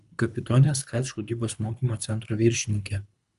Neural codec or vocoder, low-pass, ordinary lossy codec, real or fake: codec, 24 kHz, 3 kbps, HILCodec; 10.8 kHz; Opus, 64 kbps; fake